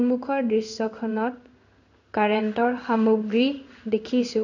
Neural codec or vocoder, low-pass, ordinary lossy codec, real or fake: codec, 16 kHz in and 24 kHz out, 1 kbps, XY-Tokenizer; 7.2 kHz; none; fake